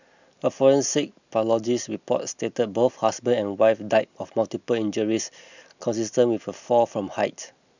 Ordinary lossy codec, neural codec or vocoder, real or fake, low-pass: none; none; real; 7.2 kHz